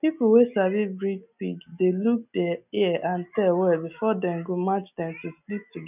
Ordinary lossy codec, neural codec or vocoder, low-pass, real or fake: none; none; 3.6 kHz; real